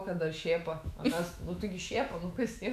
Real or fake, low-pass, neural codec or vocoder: fake; 14.4 kHz; autoencoder, 48 kHz, 128 numbers a frame, DAC-VAE, trained on Japanese speech